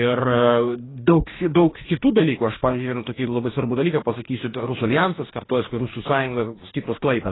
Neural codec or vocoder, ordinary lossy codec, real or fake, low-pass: codec, 44.1 kHz, 2.6 kbps, DAC; AAC, 16 kbps; fake; 7.2 kHz